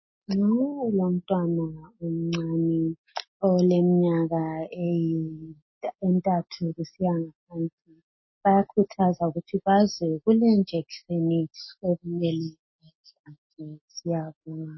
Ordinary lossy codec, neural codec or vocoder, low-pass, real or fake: MP3, 24 kbps; none; 7.2 kHz; real